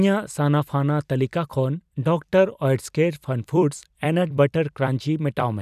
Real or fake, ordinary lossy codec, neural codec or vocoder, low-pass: fake; none; vocoder, 44.1 kHz, 128 mel bands every 256 samples, BigVGAN v2; 14.4 kHz